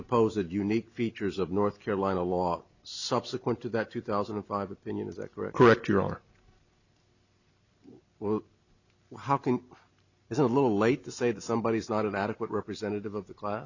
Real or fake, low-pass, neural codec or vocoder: real; 7.2 kHz; none